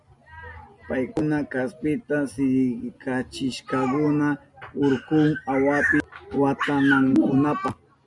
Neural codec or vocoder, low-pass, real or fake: none; 10.8 kHz; real